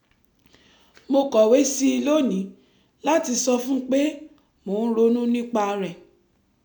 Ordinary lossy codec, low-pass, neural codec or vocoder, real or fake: none; none; none; real